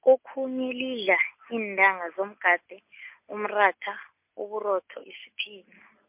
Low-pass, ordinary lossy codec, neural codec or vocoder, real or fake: 3.6 kHz; MP3, 32 kbps; none; real